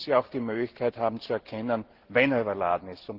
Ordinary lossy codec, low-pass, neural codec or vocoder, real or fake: Opus, 16 kbps; 5.4 kHz; none; real